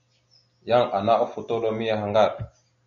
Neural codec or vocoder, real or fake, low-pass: none; real; 7.2 kHz